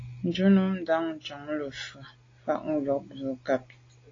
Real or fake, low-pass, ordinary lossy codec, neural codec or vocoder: real; 7.2 kHz; AAC, 32 kbps; none